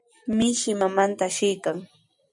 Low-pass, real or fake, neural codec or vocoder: 10.8 kHz; real; none